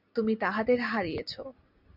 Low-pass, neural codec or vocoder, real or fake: 5.4 kHz; none; real